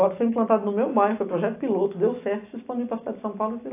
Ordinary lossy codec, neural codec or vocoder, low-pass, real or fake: AAC, 24 kbps; none; 3.6 kHz; real